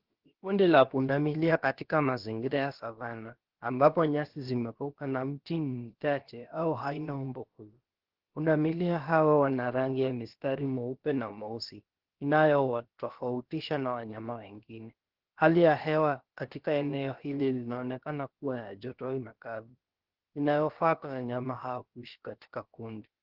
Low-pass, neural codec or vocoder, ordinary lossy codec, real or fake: 5.4 kHz; codec, 16 kHz, about 1 kbps, DyCAST, with the encoder's durations; Opus, 16 kbps; fake